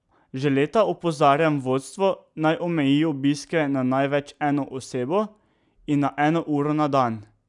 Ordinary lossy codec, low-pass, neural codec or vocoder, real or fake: none; 10.8 kHz; none; real